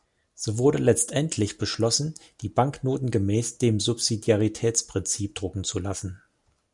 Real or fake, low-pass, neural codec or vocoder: real; 10.8 kHz; none